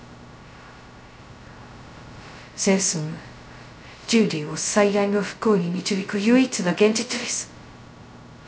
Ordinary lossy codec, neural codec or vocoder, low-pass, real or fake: none; codec, 16 kHz, 0.2 kbps, FocalCodec; none; fake